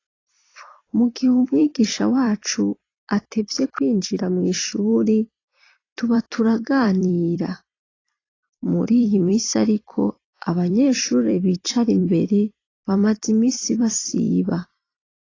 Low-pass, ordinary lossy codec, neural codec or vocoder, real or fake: 7.2 kHz; AAC, 32 kbps; vocoder, 44.1 kHz, 128 mel bands every 256 samples, BigVGAN v2; fake